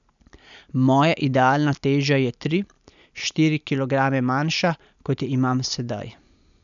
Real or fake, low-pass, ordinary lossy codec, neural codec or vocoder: real; 7.2 kHz; none; none